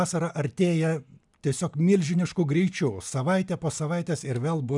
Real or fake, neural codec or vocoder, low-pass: real; none; 10.8 kHz